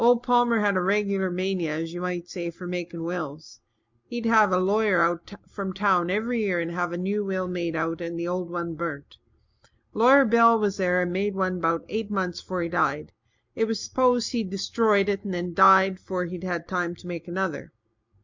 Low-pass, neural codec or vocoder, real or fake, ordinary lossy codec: 7.2 kHz; none; real; MP3, 64 kbps